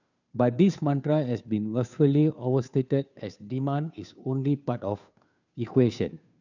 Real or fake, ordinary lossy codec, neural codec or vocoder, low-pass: fake; none; codec, 16 kHz, 2 kbps, FunCodec, trained on Chinese and English, 25 frames a second; 7.2 kHz